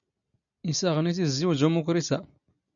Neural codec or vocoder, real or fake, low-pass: none; real; 7.2 kHz